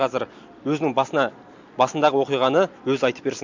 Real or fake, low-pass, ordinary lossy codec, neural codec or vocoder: real; 7.2 kHz; MP3, 64 kbps; none